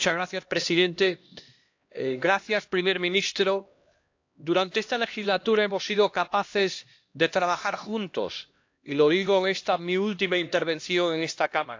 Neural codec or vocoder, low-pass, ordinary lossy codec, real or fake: codec, 16 kHz, 1 kbps, X-Codec, HuBERT features, trained on LibriSpeech; 7.2 kHz; AAC, 48 kbps; fake